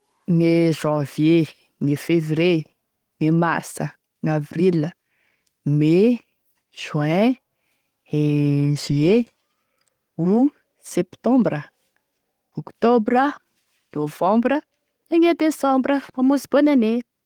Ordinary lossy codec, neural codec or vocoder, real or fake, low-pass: Opus, 24 kbps; codec, 44.1 kHz, 7.8 kbps, DAC; fake; 19.8 kHz